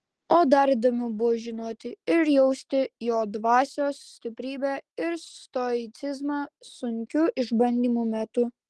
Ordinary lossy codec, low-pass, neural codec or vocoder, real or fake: Opus, 16 kbps; 10.8 kHz; none; real